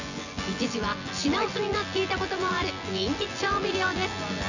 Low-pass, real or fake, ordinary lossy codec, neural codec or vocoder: 7.2 kHz; fake; none; vocoder, 24 kHz, 100 mel bands, Vocos